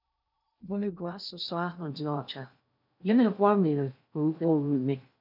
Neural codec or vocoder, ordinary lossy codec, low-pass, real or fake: codec, 16 kHz in and 24 kHz out, 0.6 kbps, FocalCodec, streaming, 2048 codes; none; 5.4 kHz; fake